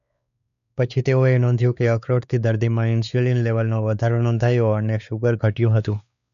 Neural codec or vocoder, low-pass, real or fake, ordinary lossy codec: codec, 16 kHz, 4 kbps, X-Codec, WavLM features, trained on Multilingual LibriSpeech; 7.2 kHz; fake; MP3, 96 kbps